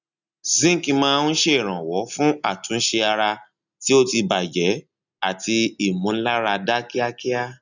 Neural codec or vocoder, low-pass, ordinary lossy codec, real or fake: none; 7.2 kHz; none; real